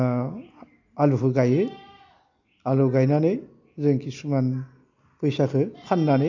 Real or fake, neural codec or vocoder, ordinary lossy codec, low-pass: real; none; none; 7.2 kHz